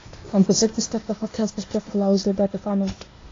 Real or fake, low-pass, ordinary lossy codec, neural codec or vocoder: fake; 7.2 kHz; AAC, 32 kbps; codec, 16 kHz, 0.8 kbps, ZipCodec